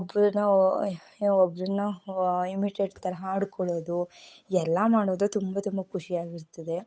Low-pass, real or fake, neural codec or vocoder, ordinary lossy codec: none; fake; codec, 16 kHz, 8 kbps, FunCodec, trained on Chinese and English, 25 frames a second; none